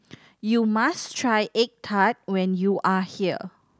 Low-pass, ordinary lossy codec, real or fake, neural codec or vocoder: none; none; real; none